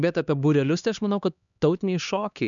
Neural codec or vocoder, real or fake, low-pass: codec, 16 kHz, 2 kbps, X-Codec, HuBERT features, trained on LibriSpeech; fake; 7.2 kHz